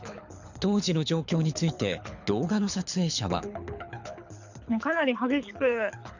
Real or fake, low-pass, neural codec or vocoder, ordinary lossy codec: fake; 7.2 kHz; codec, 24 kHz, 6 kbps, HILCodec; none